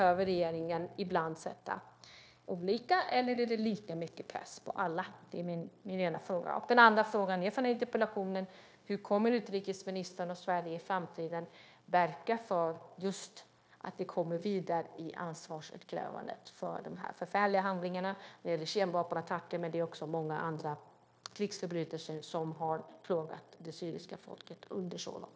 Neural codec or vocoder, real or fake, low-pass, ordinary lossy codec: codec, 16 kHz, 0.9 kbps, LongCat-Audio-Codec; fake; none; none